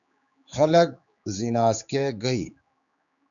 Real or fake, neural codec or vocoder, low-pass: fake; codec, 16 kHz, 4 kbps, X-Codec, HuBERT features, trained on general audio; 7.2 kHz